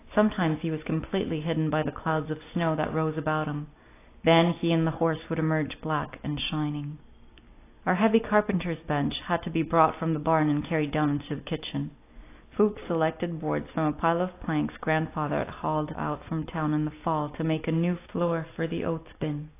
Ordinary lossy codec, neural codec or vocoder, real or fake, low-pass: AAC, 24 kbps; none; real; 3.6 kHz